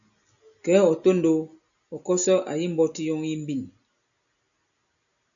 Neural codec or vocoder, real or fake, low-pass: none; real; 7.2 kHz